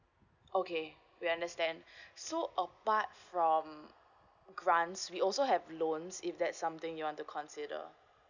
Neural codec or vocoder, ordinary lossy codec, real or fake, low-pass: none; none; real; 7.2 kHz